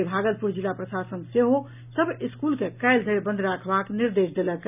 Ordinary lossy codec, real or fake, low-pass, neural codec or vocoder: none; real; 3.6 kHz; none